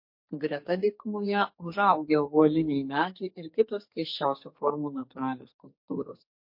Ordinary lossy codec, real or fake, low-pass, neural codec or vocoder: MP3, 32 kbps; fake; 5.4 kHz; codec, 44.1 kHz, 2.6 kbps, SNAC